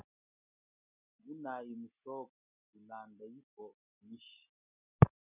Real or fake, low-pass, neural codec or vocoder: real; 3.6 kHz; none